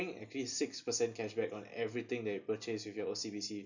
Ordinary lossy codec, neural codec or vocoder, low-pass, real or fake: none; none; 7.2 kHz; real